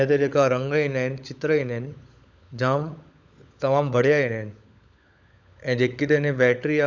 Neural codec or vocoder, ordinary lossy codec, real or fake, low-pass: codec, 16 kHz, 16 kbps, FunCodec, trained on LibriTTS, 50 frames a second; none; fake; none